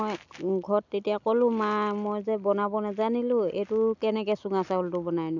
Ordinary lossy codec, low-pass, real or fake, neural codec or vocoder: none; 7.2 kHz; real; none